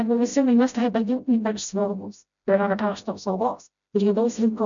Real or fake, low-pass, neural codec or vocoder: fake; 7.2 kHz; codec, 16 kHz, 0.5 kbps, FreqCodec, smaller model